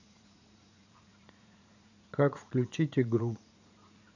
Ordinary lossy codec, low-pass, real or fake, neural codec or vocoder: none; 7.2 kHz; fake; vocoder, 44.1 kHz, 80 mel bands, Vocos